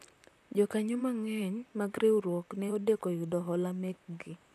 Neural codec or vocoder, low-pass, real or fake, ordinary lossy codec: vocoder, 44.1 kHz, 128 mel bands, Pupu-Vocoder; 14.4 kHz; fake; none